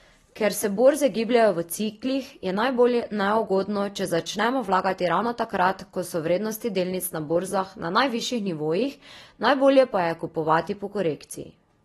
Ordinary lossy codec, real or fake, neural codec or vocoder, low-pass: AAC, 32 kbps; real; none; 14.4 kHz